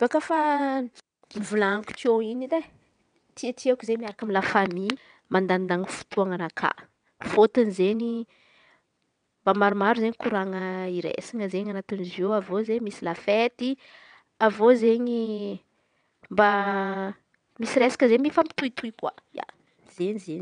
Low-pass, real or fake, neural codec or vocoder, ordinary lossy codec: 9.9 kHz; fake; vocoder, 22.05 kHz, 80 mel bands, WaveNeXt; none